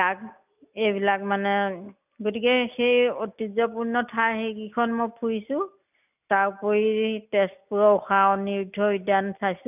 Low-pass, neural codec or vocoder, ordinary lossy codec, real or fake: 3.6 kHz; none; none; real